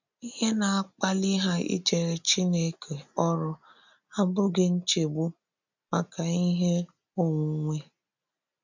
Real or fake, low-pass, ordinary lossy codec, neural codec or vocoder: real; 7.2 kHz; none; none